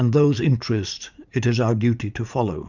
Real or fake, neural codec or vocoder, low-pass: fake; vocoder, 44.1 kHz, 80 mel bands, Vocos; 7.2 kHz